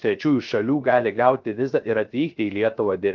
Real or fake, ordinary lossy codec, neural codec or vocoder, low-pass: fake; Opus, 32 kbps; codec, 16 kHz, 0.3 kbps, FocalCodec; 7.2 kHz